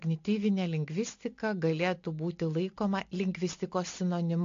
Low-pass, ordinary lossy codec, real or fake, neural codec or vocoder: 7.2 kHz; AAC, 48 kbps; real; none